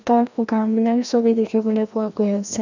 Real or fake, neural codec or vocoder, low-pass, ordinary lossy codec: fake; codec, 16 kHz, 1 kbps, FreqCodec, larger model; 7.2 kHz; none